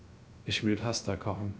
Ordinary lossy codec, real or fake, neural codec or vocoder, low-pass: none; fake; codec, 16 kHz, 0.3 kbps, FocalCodec; none